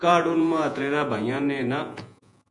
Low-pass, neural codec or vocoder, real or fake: 10.8 kHz; vocoder, 48 kHz, 128 mel bands, Vocos; fake